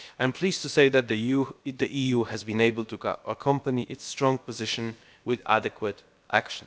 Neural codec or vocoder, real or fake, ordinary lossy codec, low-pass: codec, 16 kHz, about 1 kbps, DyCAST, with the encoder's durations; fake; none; none